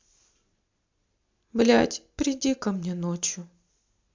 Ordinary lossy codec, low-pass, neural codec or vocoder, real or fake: MP3, 64 kbps; 7.2 kHz; none; real